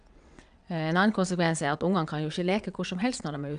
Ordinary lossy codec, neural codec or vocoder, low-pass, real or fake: none; vocoder, 22.05 kHz, 80 mel bands, WaveNeXt; 9.9 kHz; fake